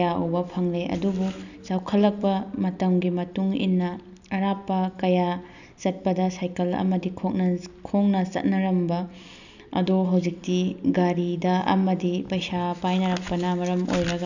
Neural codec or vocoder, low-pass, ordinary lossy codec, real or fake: none; 7.2 kHz; none; real